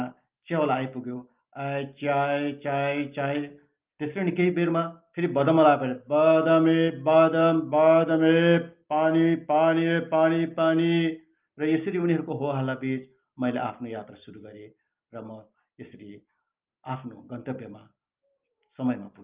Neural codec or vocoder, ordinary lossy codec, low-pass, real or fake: none; Opus, 32 kbps; 3.6 kHz; real